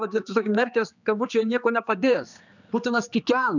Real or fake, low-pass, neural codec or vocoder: fake; 7.2 kHz; codec, 16 kHz, 2 kbps, X-Codec, HuBERT features, trained on general audio